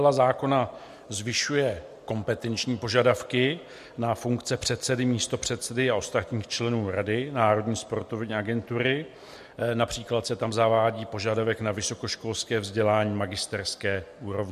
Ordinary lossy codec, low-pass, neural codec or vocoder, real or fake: MP3, 64 kbps; 14.4 kHz; none; real